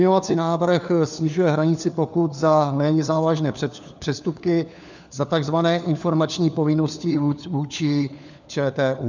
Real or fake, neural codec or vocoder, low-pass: fake; codec, 16 kHz, 4 kbps, FunCodec, trained on LibriTTS, 50 frames a second; 7.2 kHz